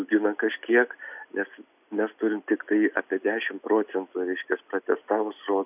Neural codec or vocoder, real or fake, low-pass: none; real; 3.6 kHz